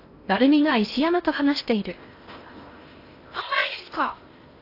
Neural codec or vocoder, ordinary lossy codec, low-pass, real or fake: codec, 16 kHz in and 24 kHz out, 0.8 kbps, FocalCodec, streaming, 65536 codes; AAC, 32 kbps; 5.4 kHz; fake